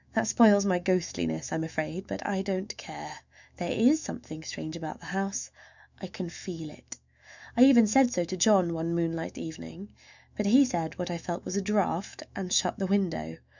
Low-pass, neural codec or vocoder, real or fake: 7.2 kHz; autoencoder, 48 kHz, 128 numbers a frame, DAC-VAE, trained on Japanese speech; fake